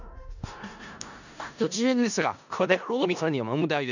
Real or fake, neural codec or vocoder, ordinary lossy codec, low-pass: fake; codec, 16 kHz in and 24 kHz out, 0.4 kbps, LongCat-Audio-Codec, four codebook decoder; none; 7.2 kHz